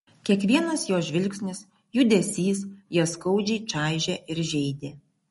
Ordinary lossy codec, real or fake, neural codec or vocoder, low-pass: MP3, 48 kbps; real; none; 19.8 kHz